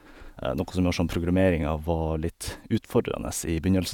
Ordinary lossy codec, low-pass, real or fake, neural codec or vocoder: none; 19.8 kHz; fake; vocoder, 48 kHz, 128 mel bands, Vocos